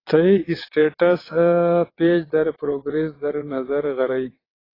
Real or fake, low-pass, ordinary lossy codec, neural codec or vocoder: fake; 5.4 kHz; AAC, 24 kbps; vocoder, 22.05 kHz, 80 mel bands, WaveNeXt